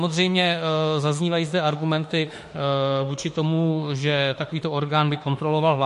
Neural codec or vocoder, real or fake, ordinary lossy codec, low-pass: autoencoder, 48 kHz, 32 numbers a frame, DAC-VAE, trained on Japanese speech; fake; MP3, 48 kbps; 14.4 kHz